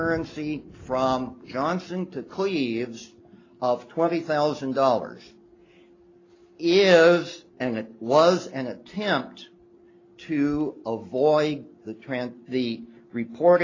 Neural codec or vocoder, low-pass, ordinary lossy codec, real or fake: none; 7.2 kHz; AAC, 32 kbps; real